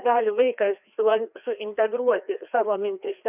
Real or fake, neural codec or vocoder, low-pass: fake; codec, 16 kHz, 2 kbps, FreqCodec, larger model; 3.6 kHz